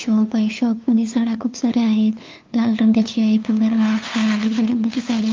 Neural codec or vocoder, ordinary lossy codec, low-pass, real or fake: codec, 16 kHz, 2 kbps, FunCodec, trained on LibriTTS, 25 frames a second; Opus, 24 kbps; 7.2 kHz; fake